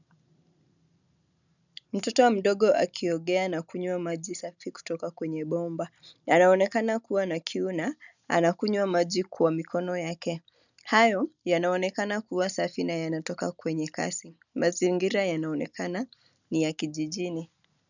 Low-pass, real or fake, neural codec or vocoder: 7.2 kHz; real; none